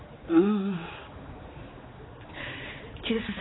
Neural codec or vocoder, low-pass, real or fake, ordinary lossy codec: codec, 16 kHz, 4 kbps, X-Codec, HuBERT features, trained on general audio; 7.2 kHz; fake; AAC, 16 kbps